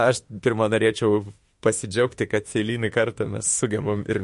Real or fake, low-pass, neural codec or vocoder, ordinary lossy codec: fake; 14.4 kHz; autoencoder, 48 kHz, 32 numbers a frame, DAC-VAE, trained on Japanese speech; MP3, 48 kbps